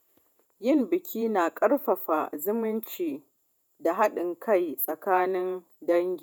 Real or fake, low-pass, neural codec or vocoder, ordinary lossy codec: fake; none; vocoder, 48 kHz, 128 mel bands, Vocos; none